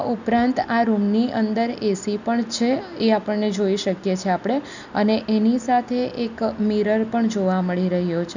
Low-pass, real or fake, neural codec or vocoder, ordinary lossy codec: 7.2 kHz; real; none; none